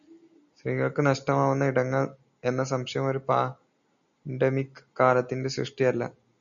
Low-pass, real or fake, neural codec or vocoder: 7.2 kHz; real; none